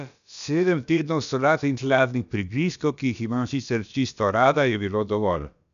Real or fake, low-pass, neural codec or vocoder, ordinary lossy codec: fake; 7.2 kHz; codec, 16 kHz, about 1 kbps, DyCAST, with the encoder's durations; none